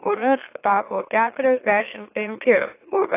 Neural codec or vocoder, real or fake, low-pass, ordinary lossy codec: autoencoder, 44.1 kHz, a latent of 192 numbers a frame, MeloTTS; fake; 3.6 kHz; AAC, 24 kbps